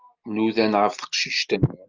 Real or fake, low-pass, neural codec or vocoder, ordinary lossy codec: real; 7.2 kHz; none; Opus, 24 kbps